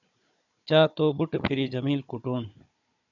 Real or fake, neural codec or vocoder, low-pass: fake; codec, 16 kHz, 16 kbps, FunCodec, trained on Chinese and English, 50 frames a second; 7.2 kHz